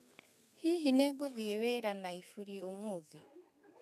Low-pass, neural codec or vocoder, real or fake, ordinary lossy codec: 14.4 kHz; codec, 32 kHz, 1.9 kbps, SNAC; fake; none